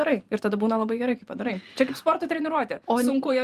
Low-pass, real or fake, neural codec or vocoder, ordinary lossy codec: 14.4 kHz; fake; vocoder, 44.1 kHz, 128 mel bands every 512 samples, BigVGAN v2; Opus, 32 kbps